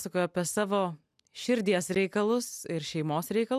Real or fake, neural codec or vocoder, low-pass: real; none; 14.4 kHz